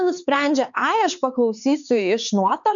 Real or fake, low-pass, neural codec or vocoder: fake; 7.2 kHz; codec, 16 kHz, 4 kbps, X-Codec, HuBERT features, trained on LibriSpeech